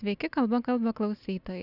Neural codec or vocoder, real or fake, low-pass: none; real; 5.4 kHz